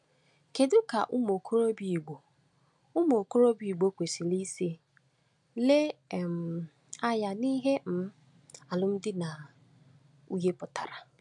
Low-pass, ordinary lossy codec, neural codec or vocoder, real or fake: 10.8 kHz; none; none; real